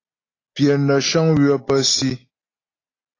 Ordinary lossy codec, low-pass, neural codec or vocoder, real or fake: AAC, 32 kbps; 7.2 kHz; none; real